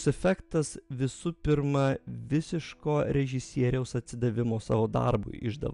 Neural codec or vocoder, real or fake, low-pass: none; real; 10.8 kHz